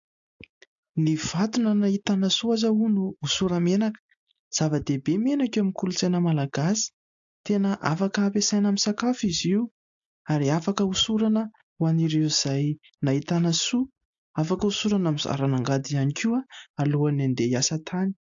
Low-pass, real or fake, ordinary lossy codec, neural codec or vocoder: 7.2 kHz; real; AAC, 64 kbps; none